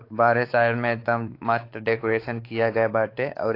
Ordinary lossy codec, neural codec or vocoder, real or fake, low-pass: AAC, 32 kbps; codec, 16 kHz, 4 kbps, X-Codec, WavLM features, trained on Multilingual LibriSpeech; fake; 5.4 kHz